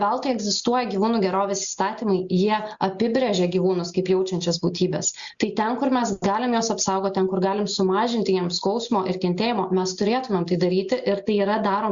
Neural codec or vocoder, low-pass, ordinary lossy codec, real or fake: none; 7.2 kHz; Opus, 64 kbps; real